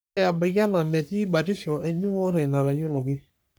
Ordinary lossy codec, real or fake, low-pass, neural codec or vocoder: none; fake; none; codec, 44.1 kHz, 3.4 kbps, Pupu-Codec